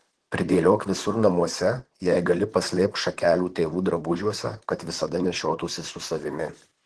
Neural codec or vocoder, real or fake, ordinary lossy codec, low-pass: vocoder, 44.1 kHz, 128 mel bands, Pupu-Vocoder; fake; Opus, 16 kbps; 10.8 kHz